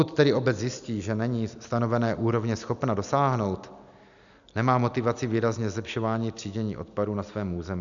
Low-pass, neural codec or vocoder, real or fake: 7.2 kHz; none; real